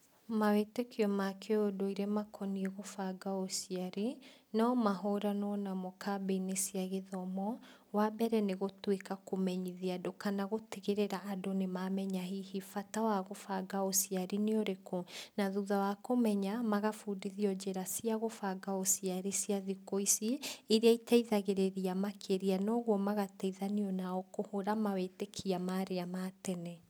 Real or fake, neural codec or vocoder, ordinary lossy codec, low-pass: real; none; none; none